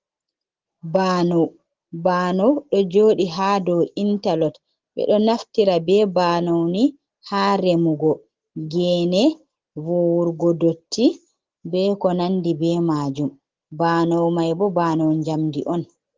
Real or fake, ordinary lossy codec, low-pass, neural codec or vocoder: real; Opus, 16 kbps; 7.2 kHz; none